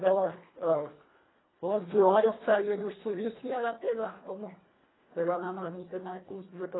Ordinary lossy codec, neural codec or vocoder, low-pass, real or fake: AAC, 16 kbps; codec, 24 kHz, 1.5 kbps, HILCodec; 7.2 kHz; fake